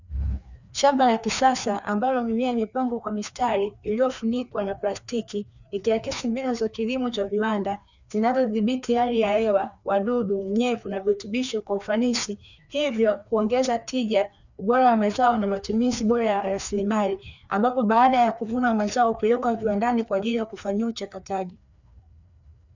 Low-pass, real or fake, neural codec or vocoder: 7.2 kHz; fake; codec, 16 kHz, 2 kbps, FreqCodec, larger model